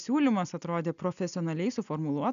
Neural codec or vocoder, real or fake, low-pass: none; real; 7.2 kHz